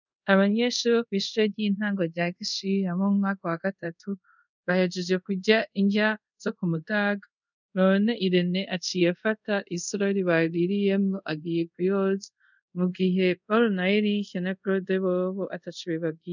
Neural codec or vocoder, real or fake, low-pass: codec, 24 kHz, 0.5 kbps, DualCodec; fake; 7.2 kHz